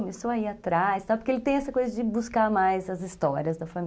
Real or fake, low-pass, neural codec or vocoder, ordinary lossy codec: real; none; none; none